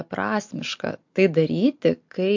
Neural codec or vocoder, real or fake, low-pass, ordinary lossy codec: none; real; 7.2 kHz; MP3, 48 kbps